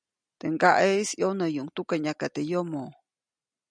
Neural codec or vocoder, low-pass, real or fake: none; 9.9 kHz; real